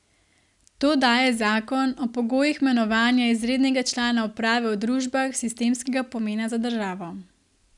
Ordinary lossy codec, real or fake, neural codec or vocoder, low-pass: none; real; none; 10.8 kHz